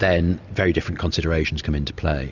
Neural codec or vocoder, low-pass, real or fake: none; 7.2 kHz; real